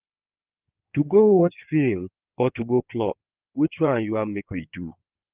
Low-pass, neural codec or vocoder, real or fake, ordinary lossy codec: 3.6 kHz; codec, 16 kHz in and 24 kHz out, 2.2 kbps, FireRedTTS-2 codec; fake; Opus, 24 kbps